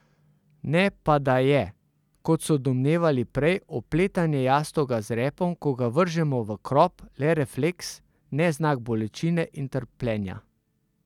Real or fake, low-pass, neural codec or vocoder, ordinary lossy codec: real; 19.8 kHz; none; none